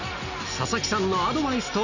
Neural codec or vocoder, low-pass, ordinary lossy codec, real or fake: none; 7.2 kHz; none; real